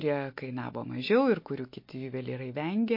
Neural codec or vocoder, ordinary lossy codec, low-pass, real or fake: none; MP3, 32 kbps; 5.4 kHz; real